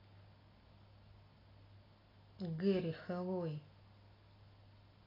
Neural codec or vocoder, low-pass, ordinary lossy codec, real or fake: none; 5.4 kHz; AAC, 24 kbps; real